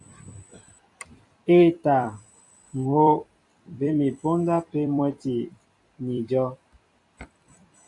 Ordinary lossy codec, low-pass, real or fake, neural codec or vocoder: AAC, 64 kbps; 10.8 kHz; fake; vocoder, 44.1 kHz, 128 mel bands every 256 samples, BigVGAN v2